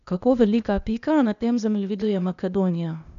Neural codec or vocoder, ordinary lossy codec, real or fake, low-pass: codec, 16 kHz, 0.8 kbps, ZipCodec; none; fake; 7.2 kHz